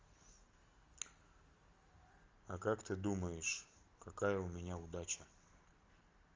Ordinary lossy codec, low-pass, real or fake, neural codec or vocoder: Opus, 32 kbps; 7.2 kHz; real; none